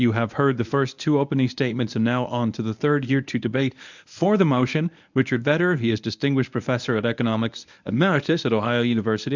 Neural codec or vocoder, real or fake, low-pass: codec, 24 kHz, 0.9 kbps, WavTokenizer, medium speech release version 1; fake; 7.2 kHz